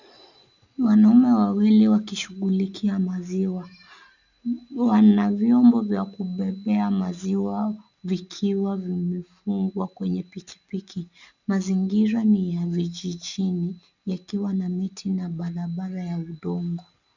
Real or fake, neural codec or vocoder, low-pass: real; none; 7.2 kHz